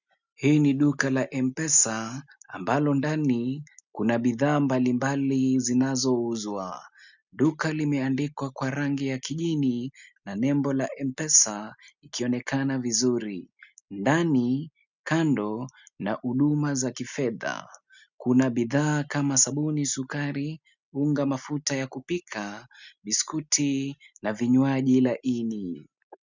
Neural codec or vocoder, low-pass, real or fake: none; 7.2 kHz; real